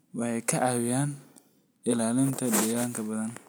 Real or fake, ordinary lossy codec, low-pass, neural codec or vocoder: real; none; none; none